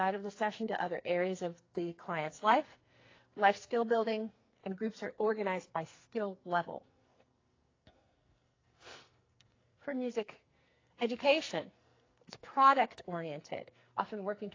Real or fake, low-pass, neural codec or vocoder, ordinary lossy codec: fake; 7.2 kHz; codec, 44.1 kHz, 2.6 kbps, SNAC; AAC, 32 kbps